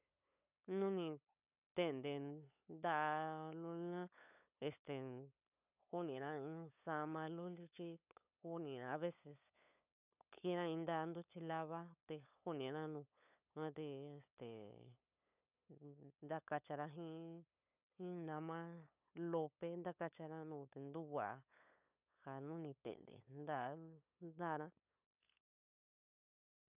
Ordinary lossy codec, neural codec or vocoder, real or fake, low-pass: none; codec, 16 kHz, 8 kbps, FunCodec, trained on LibriTTS, 25 frames a second; fake; 3.6 kHz